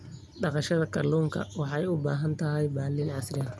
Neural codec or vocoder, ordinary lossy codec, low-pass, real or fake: none; none; none; real